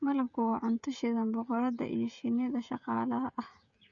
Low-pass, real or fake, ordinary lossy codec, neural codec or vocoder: 7.2 kHz; fake; Opus, 64 kbps; codec, 16 kHz, 16 kbps, FreqCodec, smaller model